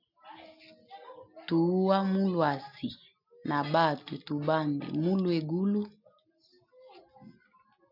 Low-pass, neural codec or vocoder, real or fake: 5.4 kHz; none; real